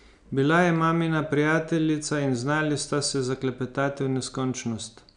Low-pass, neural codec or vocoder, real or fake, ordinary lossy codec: 9.9 kHz; none; real; none